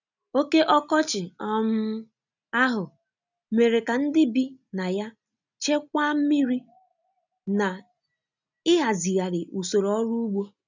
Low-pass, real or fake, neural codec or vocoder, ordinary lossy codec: 7.2 kHz; real; none; none